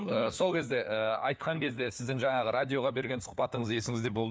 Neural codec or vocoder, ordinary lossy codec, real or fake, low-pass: codec, 16 kHz, 4 kbps, FunCodec, trained on LibriTTS, 50 frames a second; none; fake; none